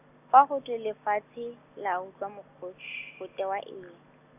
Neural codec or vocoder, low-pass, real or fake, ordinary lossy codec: none; 3.6 kHz; real; none